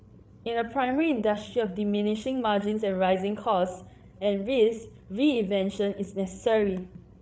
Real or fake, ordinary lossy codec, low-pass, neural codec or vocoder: fake; none; none; codec, 16 kHz, 8 kbps, FreqCodec, larger model